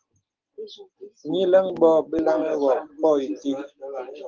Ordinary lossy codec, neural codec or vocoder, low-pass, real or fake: Opus, 16 kbps; none; 7.2 kHz; real